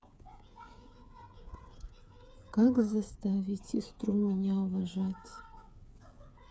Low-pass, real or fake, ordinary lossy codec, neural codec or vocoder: none; fake; none; codec, 16 kHz, 8 kbps, FreqCodec, smaller model